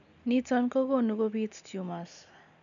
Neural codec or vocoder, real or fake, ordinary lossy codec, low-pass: none; real; none; 7.2 kHz